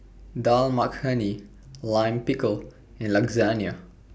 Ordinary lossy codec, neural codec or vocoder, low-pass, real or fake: none; none; none; real